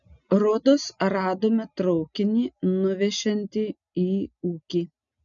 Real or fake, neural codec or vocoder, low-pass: real; none; 7.2 kHz